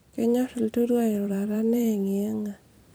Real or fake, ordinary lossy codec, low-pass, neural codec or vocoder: fake; none; none; vocoder, 44.1 kHz, 128 mel bands every 256 samples, BigVGAN v2